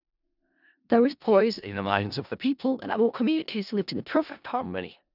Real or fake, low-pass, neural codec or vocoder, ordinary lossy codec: fake; 5.4 kHz; codec, 16 kHz in and 24 kHz out, 0.4 kbps, LongCat-Audio-Codec, four codebook decoder; none